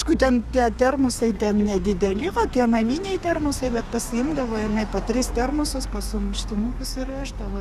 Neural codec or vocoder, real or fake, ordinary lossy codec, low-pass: codec, 32 kHz, 1.9 kbps, SNAC; fake; Opus, 64 kbps; 14.4 kHz